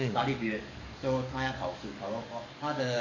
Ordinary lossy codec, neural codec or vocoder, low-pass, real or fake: none; codec, 44.1 kHz, 7.8 kbps, DAC; 7.2 kHz; fake